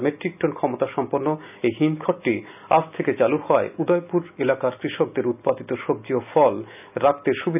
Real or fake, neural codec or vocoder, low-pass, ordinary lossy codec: real; none; 3.6 kHz; none